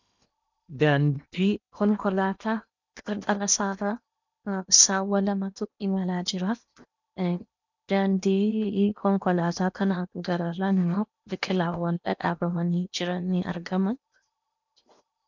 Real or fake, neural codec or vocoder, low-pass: fake; codec, 16 kHz in and 24 kHz out, 0.8 kbps, FocalCodec, streaming, 65536 codes; 7.2 kHz